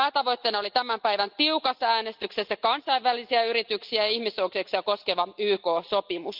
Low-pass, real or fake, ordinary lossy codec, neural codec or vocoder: 5.4 kHz; real; Opus, 24 kbps; none